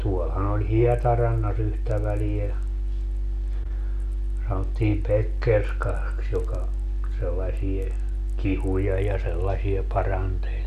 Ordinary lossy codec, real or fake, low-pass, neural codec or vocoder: none; real; 14.4 kHz; none